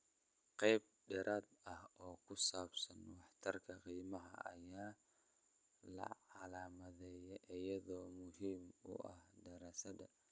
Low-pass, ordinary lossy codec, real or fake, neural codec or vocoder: none; none; real; none